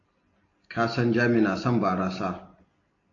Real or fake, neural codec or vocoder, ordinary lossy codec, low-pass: real; none; AAC, 32 kbps; 7.2 kHz